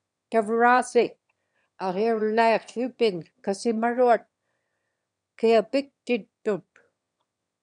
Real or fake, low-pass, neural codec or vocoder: fake; 9.9 kHz; autoencoder, 22.05 kHz, a latent of 192 numbers a frame, VITS, trained on one speaker